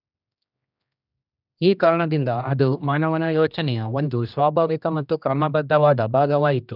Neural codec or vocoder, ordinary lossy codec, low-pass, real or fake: codec, 16 kHz, 1 kbps, X-Codec, HuBERT features, trained on general audio; none; 5.4 kHz; fake